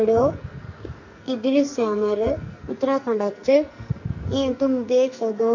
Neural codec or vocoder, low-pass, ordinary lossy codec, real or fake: codec, 44.1 kHz, 2.6 kbps, SNAC; 7.2 kHz; MP3, 48 kbps; fake